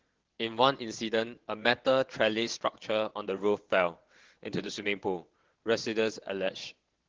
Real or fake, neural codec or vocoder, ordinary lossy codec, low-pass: fake; vocoder, 22.05 kHz, 80 mel bands, WaveNeXt; Opus, 16 kbps; 7.2 kHz